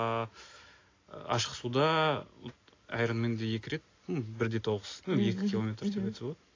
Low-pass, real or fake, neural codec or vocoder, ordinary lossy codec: 7.2 kHz; real; none; AAC, 32 kbps